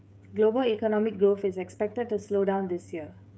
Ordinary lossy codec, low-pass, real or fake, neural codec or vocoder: none; none; fake; codec, 16 kHz, 16 kbps, FreqCodec, smaller model